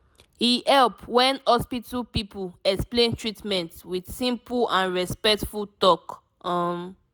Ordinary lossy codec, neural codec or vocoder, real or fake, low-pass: none; none; real; none